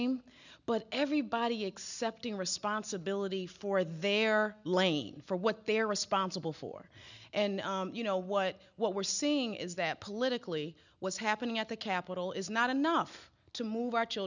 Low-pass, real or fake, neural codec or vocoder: 7.2 kHz; real; none